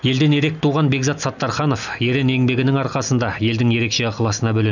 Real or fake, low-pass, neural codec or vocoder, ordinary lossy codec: real; 7.2 kHz; none; none